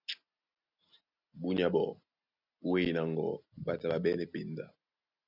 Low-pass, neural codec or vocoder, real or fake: 5.4 kHz; none; real